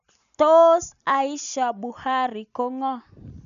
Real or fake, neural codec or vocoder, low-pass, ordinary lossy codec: real; none; 7.2 kHz; none